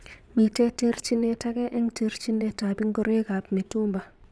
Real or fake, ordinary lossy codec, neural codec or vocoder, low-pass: fake; none; vocoder, 22.05 kHz, 80 mel bands, Vocos; none